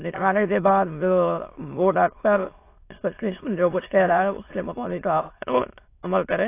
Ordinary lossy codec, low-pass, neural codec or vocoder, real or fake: AAC, 24 kbps; 3.6 kHz; autoencoder, 22.05 kHz, a latent of 192 numbers a frame, VITS, trained on many speakers; fake